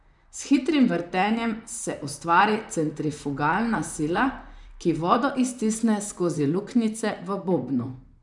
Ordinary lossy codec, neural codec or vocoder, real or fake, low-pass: none; vocoder, 44.1 kHz, 128 mel bands, Pupu-Vocoder; fake; 10.8 kHz